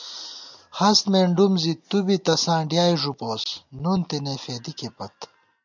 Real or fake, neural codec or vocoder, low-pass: real; none; 7.2 kHz